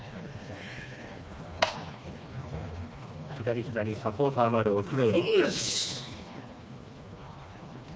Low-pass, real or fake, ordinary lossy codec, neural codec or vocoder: none; fake; none; codec, 16 kHz, 2 kbps, FreqCodec, smaller model